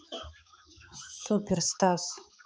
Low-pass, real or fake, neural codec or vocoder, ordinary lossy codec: none; fake; codec, 16 kHz, 4 kbps, X-Codec, HuBERT features, trained on balanced general audio; none